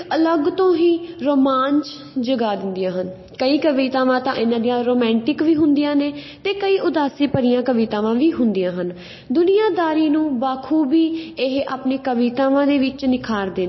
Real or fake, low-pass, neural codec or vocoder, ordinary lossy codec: real; 7.2 kHz; none; MP3, 24 kbps